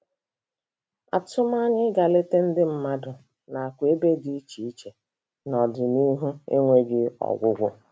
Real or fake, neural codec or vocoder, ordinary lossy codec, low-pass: real; none; none; none